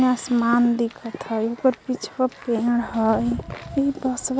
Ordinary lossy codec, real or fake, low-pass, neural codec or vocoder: none; real; none; none